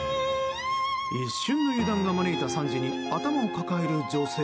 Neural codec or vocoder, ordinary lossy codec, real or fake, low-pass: none; none; real; none